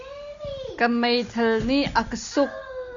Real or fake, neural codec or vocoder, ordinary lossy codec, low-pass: real; none; AAC, 64 kbps; 7.2 kHz